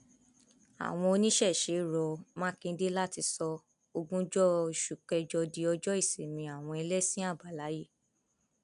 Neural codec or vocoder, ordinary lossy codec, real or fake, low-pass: none; none; real; 10.8 kHz